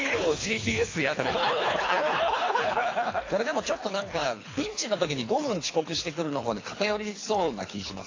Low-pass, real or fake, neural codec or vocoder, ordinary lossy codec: 7.2 kHz; fake; codec, 24 kHz, 3 kbps, HILCodec; AAC, 32 kbps